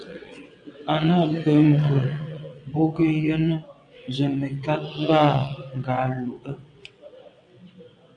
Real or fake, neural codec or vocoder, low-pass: fake; vocoder, 22.05 kHz, 80 mel bands, WaveNeXt; 9.9 kHz